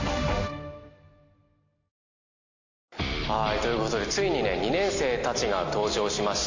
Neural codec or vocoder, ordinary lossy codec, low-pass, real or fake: none; AAC, 48 kbps; 7.2 kHz; real